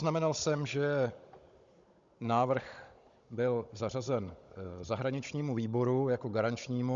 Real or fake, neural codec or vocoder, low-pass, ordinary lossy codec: fake; codec, 16 kHz, 16 kbps, FunCodec, trained on Chinese and English, 50 frames a second; 7.2 kHz; Opus, 64 kbps